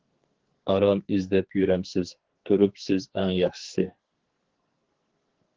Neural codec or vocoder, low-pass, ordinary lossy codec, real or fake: codec, 44.1 kHz, 2.6 kbps, SNAC; 7.2 kHz; Opus, 16 kbps; fake